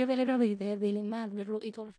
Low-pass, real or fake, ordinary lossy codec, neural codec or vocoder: 9.9 kHz; fake; MP3, 64 kbps; codec, 16 kHz in and 24 kHz out, 0.4 kbps, LongCat-Audio-Codec, four codebook decoder